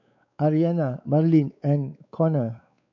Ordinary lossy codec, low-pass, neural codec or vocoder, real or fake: none; 7.2 kHz; codec, 16 kHz, 4 kbps, X-Codec, WavLM features, trained on Multilingual LibriSpeech; fake